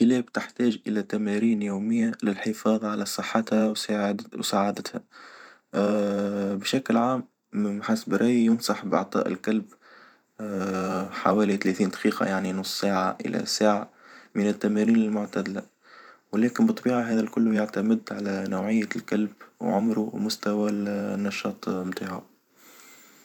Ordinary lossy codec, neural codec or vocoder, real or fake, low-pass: none; vocoder, 44.1 kHz, 128 mel bands every 512 samples, BigVGAN v2; fake; 19.8 kHz